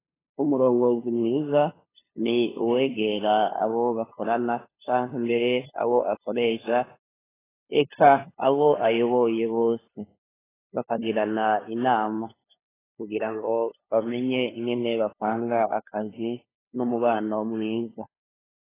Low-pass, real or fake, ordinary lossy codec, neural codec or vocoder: 3.6 kHz; fake; AAC, 16 kbps; codec, 16 kHz, 2 kbps, FunCodec, trained on LibriTTS, 25 frames a second